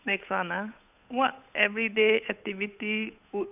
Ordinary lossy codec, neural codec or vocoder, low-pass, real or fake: none; codec, 16 kHz, 8 kbps, FunCodec, trained on Chinese and English, 25 frames a second; 3.6 kHz; fake